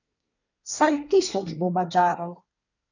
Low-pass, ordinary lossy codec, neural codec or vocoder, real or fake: 7.2 kHz; none; codec, 24 kHz, 1 kbps, SNAC; fake